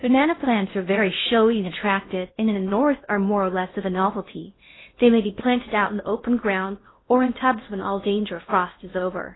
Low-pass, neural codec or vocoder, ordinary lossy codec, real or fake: 7.2 kHz; codec, 16 kHz in and 24 kHz out, 0.8 kbps, FocalCodec, streaming, 65536 codes; AAC, 16 kbps; fake